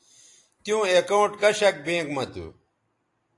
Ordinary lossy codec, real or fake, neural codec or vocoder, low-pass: AAC, 48 kbps; real; none; 10.8 kHz